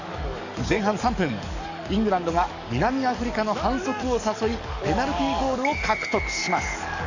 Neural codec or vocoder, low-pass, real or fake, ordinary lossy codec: codec, 44.1 kHz, 7.8 kbps, DAC; 7.2 kHz; fake; none